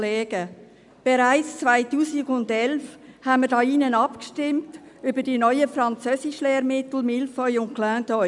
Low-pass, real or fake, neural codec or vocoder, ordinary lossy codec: 10.8 kHz; real; none; none